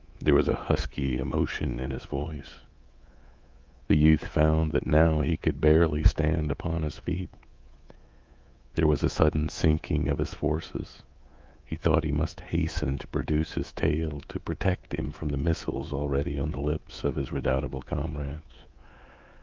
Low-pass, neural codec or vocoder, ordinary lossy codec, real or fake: 7.2 kHz; codec, 24 kHz, 3.1 kbps, DualCodec; Opus, 32 kbps; fake